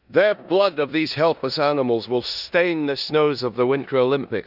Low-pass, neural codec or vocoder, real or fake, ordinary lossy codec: 5.4 kHz; codec, 16 kHz in and 24 kHz out, 0.9 kbps, LongCat-Audio-Codec, four codebook decoder; fake; none